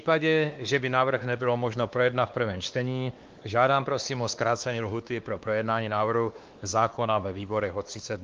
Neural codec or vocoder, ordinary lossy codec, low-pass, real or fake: codec, 16 kHz, 2 kbps, X-Codec, WavLM features, trained on Multilingual LibriSpeech; Opus, 32 kbps; 7.2 kHz; fake